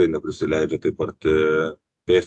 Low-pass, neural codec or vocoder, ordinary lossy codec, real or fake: 10.8 kHz; autoencoder, 48 kHz, 128 numbers a frame, DAC-VAE, trained on Japanese speech; AAC, 64 kbps; fake